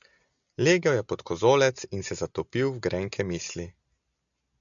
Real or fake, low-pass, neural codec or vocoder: real; 7.2 kHz; none